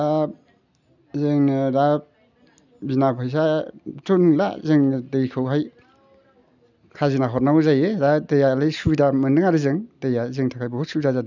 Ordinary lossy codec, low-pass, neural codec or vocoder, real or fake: none; 7.2 kHz; none; real